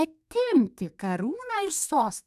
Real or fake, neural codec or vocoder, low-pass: fake; codec, 32 kHz, 1.9 kbps, SNAC; 14.4 kHz